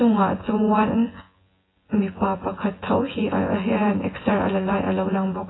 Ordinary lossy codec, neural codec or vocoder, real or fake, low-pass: AAC, 16 kbps; vocoder, 24 kHz, 100 mel bands, Vocos; fake; 7.2 kHz